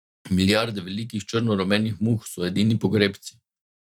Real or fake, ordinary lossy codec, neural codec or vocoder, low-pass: fake; none; vocoder, 44.1 kHz, 128 mel bands every 512 samples, BigVGAN v2; 19.8 kHz